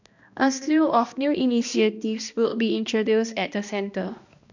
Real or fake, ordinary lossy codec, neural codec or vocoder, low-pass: fake; none; codec, 16 kHz, 1 kbps, X-Codec, HuBERT features, trained on balanced general audio; 7.2 kHz